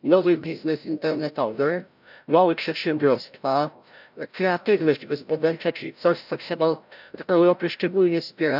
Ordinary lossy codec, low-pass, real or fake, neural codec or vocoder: none; 5.4 kHz; fake; codec, 16 kHz, 0.5 kbps, FreqCodec, larger model